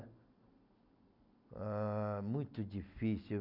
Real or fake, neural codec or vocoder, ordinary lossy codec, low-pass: real; none; none; 5.4 kHz